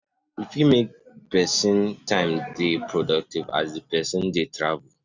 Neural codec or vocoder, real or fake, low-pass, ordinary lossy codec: none; real; 7.2 kHz; none